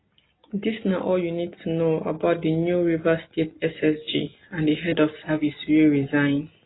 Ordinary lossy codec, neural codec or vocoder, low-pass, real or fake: AAC, 16 kbps; none; 7.2 kHz; real